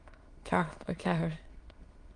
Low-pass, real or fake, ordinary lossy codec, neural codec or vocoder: 9.9 kHz; fake; Opus, 24 kbps; autoencoder, 22.05 kHz, a latent of 192 numbers a frame, VITS, trained on many speakers